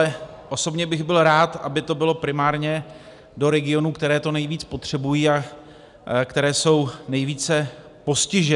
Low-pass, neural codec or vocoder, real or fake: 10.8 kHz; none; real